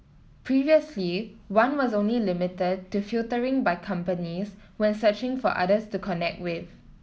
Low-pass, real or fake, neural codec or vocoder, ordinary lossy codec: none; real; none; none